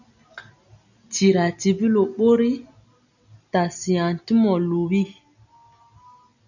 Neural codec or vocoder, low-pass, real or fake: none; 7.2 kHz; real